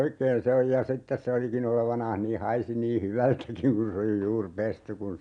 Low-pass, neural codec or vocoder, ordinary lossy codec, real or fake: 9.9 kHz; none; none; real